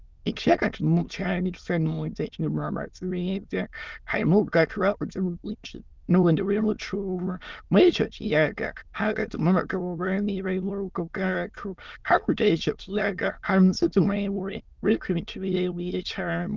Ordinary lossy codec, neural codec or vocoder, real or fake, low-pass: Opus, 32 kbps; autoencoder, 22.05 kHz, a latent of 192 numbers a frame, VITS, trained on many speakers; fake; 7.2 kHz